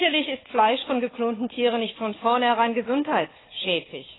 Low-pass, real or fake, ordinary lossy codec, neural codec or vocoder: 7.2 kHz; fake; AAC, 16 kbps; codec, 16 kHz, 4 kbps, FunCodec, trained on Chinese and English, 50 frames a second